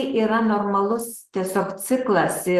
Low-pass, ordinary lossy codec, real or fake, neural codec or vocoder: 14.4 kHz; Opus, 16 kbps; real; none